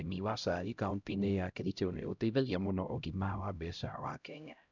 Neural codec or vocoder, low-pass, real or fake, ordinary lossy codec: codec, 16 kHz, 0.5 kbps, X-Codec, HuBERT features, trained on LibriSpeech; 7.2 kHz; fake; none